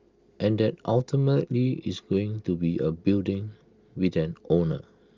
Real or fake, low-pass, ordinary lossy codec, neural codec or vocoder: real; 7.2 kHz; Opus, 32 kbps; none